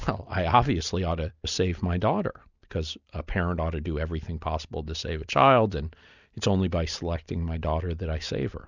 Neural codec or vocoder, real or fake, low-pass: none; real; 7.2 kHz